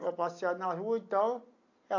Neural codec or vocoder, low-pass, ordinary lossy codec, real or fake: none; 7.2 kHz; AAC, 48 kbps; real